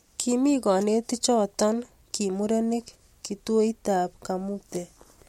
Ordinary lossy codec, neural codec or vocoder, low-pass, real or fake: MP3, 64 kbps; none; 19.8 kHz; real